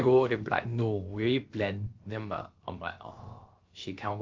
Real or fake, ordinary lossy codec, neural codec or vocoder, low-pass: fake; Opus, 32 kbps; codec, 16 kHz, about 1 kbps, DyCAST, with the encoder's durations; 7.2 kHz